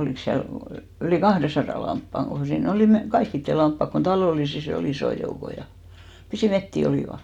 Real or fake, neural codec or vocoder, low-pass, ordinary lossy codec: real; none; 19.8 kHz; none